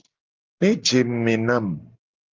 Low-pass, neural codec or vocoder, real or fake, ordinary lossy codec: 7.2 kHz; none; real; Opus, 24 kbps